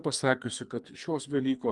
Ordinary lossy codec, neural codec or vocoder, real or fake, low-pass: Opus, 32 kbps; codec, 44.1 kHz, 2.6 kbps, SNAC; fake; 10.8 kHz